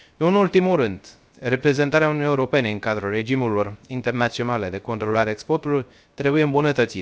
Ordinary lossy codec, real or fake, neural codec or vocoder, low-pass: none; fake; codec, 16 kHz, 0.3 kbps, FocalCodec; none